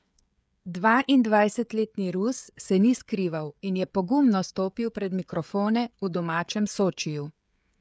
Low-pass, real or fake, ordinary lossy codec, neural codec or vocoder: none; fake; none; codec, 16 kHz, 16 kbps, FreqCodec, smaller model